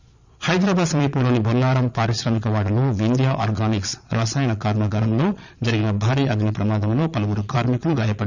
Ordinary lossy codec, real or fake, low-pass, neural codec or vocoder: none; fake; 7.2 kHz; vocoder, 22.05 kHz, 80 mel bands, Vocos